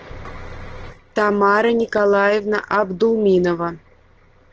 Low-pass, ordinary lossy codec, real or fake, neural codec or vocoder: 7.2 kHz; Opus, 16 kbps; real; none